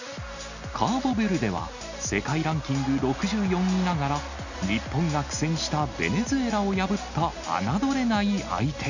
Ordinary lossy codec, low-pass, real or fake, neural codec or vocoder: none; 7.2 kHz; real; none